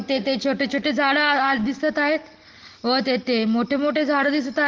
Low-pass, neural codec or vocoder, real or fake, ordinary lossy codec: 7.2 kHz; none; real; Opus, 16 kbps